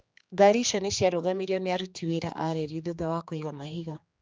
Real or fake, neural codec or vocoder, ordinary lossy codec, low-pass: fake; codec, 16 kHz, 2 kbps, X-Codec, HuBERT features, trained on general audio; none; none